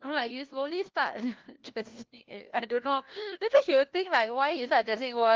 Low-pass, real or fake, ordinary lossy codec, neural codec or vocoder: 7.2 kHz; fake; Opus, 16 kbps; codec, 16 kHz, 1 kbps, FunCodec, trained on LibriTTS, 50 frames a second